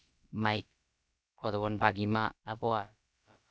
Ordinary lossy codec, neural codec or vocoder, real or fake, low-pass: none; codec, 16 kHz, about 1 kbps, DyCAST, with the encoder's durations; fake; none